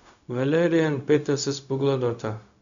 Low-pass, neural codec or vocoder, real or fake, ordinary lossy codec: 7.2 kHz; codec, 16 kHz, 0.4 kbps, LongCat-Audio-Codec; fake; none